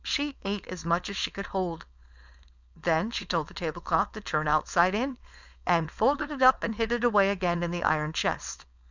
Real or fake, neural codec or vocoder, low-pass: fake; codec, 16 kHz, 4.8 kbps, FACodec; 7.2 kHz